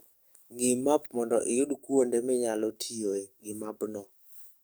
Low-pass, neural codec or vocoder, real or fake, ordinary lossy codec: none; codec, 44.1 kHz, 7.8 kbps, DAC; fake; none